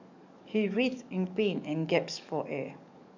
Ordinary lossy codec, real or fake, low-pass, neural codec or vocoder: none; fake; 7.2 kHz; codec, 44.1 kHz, 7.8 kbps, DAC